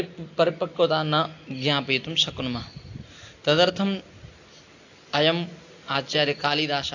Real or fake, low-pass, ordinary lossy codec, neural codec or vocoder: real; 7.2 kHz; AAC, 48 kbps; none